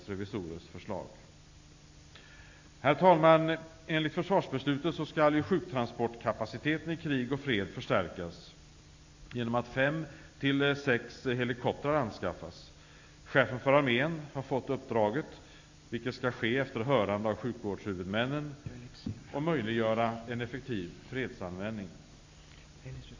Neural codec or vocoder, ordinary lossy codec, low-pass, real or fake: none; AAC, 48 kbps; 7.2 kHz; real